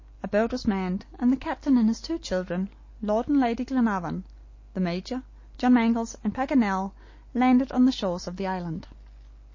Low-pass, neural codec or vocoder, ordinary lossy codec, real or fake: 7.2 kHz; none; MP3, 32 kbps; real